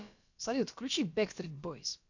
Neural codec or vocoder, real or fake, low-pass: codec, 16 kHz, about 1 kbps, DyCAST, with the encoder's durations; fake; 7.2 kHz